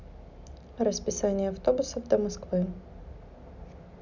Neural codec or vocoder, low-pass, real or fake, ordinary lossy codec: none; 7.2 kHz; real; none